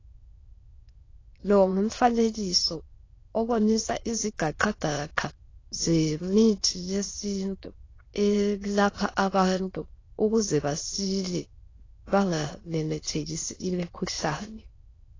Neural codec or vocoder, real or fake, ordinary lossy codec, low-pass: autoencoder, 22.05 kHz, a latent of 192 numbers a frame, VITS, trained on many speakers; fake; AAC, 32 kbps; 7.2 kHz